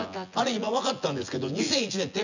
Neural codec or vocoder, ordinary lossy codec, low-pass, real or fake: vocoder, 24 kHz, 100 mel bands, Vocos; none; 7.2 kHz; fake